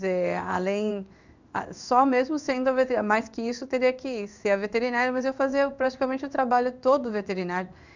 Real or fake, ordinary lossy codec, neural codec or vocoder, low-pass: fake; none; codec, 16 kHz in and 24 kHz out, 1 kbps, XY-Tokenizer; 7.2 kHz